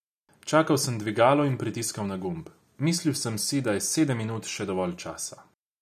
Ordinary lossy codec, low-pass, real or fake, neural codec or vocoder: none; 14.4 kHz; real; none